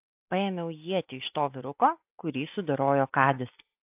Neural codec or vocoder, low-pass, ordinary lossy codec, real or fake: none; 3.6 kHz; AAC, 32 kbps; real